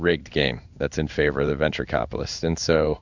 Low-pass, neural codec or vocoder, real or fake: 7.2 kHz; codec, 16 kHz in and 24 kHz out, 1 kbps, XY-Tokenizer; fake